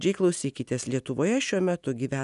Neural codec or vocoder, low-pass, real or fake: none; 10.8 kHz; real